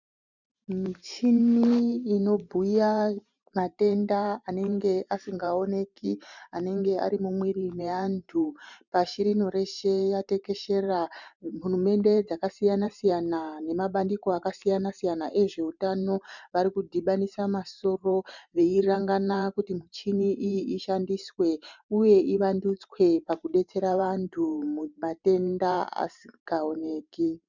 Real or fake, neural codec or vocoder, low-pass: fake; vocoder, 44.1 kHz, 128 mel bands every 512 samples, BigVGAN v2; 7.2 kHz